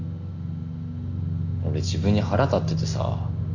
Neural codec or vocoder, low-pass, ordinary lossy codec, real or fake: none; 7.2 kHz; none; real